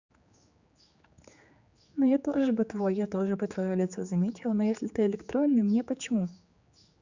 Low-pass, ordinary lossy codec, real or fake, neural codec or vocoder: 7.2 kHz; Opus, 64 kbps; fake; codec, 16 kHz, 4 kbps, X-Codec, HuBERT features, trained on general audio